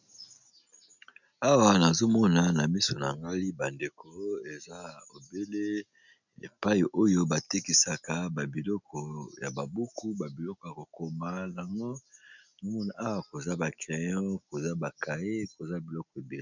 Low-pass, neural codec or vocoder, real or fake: 7.2 kHz; none; real